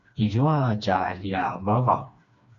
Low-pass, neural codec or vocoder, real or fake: 7.2 kHz; codec, 16 kHz, 2 kbps, FreqCodec, smaller model; fake